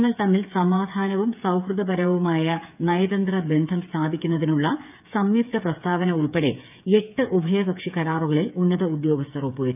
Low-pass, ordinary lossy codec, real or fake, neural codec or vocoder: 3.6 kHz; none; fake; codec, 16 kHz, 8 kbps, FreqCodec, smaller model